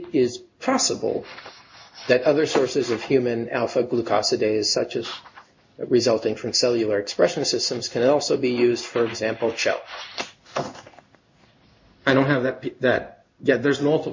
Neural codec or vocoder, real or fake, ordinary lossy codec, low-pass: codec, 16 kHz in and 24 kHz out, 1 kbps, XY-Tokenizer; fake; MP3, 48 kbps; 7.2 kHz